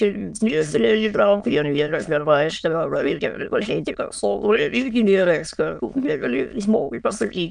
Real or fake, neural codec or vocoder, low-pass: fake; autoencoder, 22.05 kHz, a latent of 192 numbers a frame, VITS, trained on many speakers; 9.9 kHz